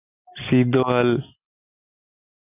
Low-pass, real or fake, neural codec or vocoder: 3.6 kHz; real; none